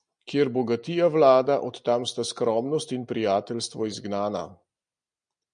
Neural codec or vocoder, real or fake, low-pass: none; real; 9.9 kHz